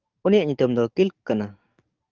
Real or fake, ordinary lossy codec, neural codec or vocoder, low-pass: fake; Opus, 24 kbps; codec, 44.1 kHz, 7.8 kbps, DAC; 7.2 kHz